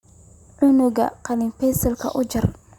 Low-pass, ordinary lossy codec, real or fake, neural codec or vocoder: 19.8 kHz; Opus, 64 kbps; real; none